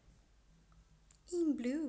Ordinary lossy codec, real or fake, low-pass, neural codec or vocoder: none; real; none; none